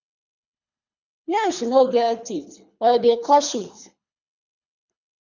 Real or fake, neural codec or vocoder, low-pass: fake; codec, 24 kHz, 3 kbps, HILCodec; 7.2 kHz